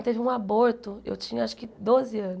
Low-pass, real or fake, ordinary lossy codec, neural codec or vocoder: none; real; none; none